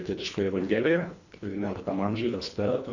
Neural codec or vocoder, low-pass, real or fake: codec, 24 kHz, 1.5 kbps, HILCodec; 7.2 kHz; fake